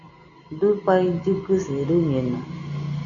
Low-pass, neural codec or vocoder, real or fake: 7.2 kHz; none; real